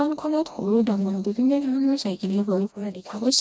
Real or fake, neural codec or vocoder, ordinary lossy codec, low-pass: fake; codec, 16 kHz, 1 kbps, FreqCodec, smaller model; none; none